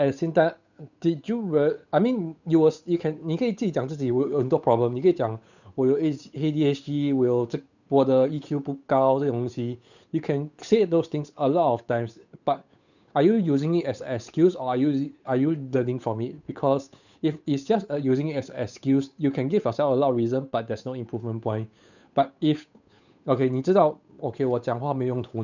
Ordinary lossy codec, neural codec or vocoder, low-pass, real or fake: none; codec, 16 kHz, 8 kbps, FunCodec, trained on Chinese and English, 25 frames a second; 7.2 kHz; fake